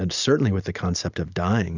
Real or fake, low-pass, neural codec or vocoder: real; 7.2 kHz; none